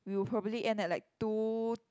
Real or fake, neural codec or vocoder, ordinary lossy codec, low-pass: real; none; none; none